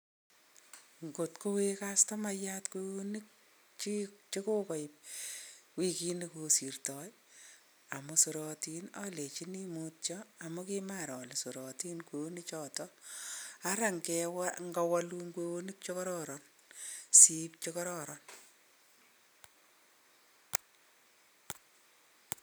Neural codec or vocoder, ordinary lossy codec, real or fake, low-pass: none; none; real; none